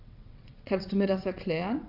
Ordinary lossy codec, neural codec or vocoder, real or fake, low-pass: none; vocoder, 22.05 kHz, 80 mel bands, WaveNeXt; fake; 5.4 kHz